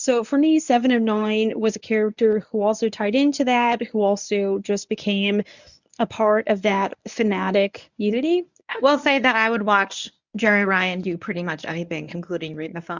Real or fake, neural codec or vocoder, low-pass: fake; codec, 24 kHz, 0.9 kbps, WavTokenizer, medium speech release version 1; 7.2 kHz